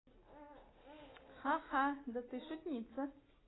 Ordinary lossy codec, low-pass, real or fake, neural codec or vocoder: AAC, 16 kbps; 7.2 kHz; real; none